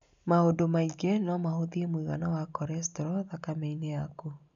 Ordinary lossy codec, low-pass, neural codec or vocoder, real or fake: none; 7.2 kHz; none; real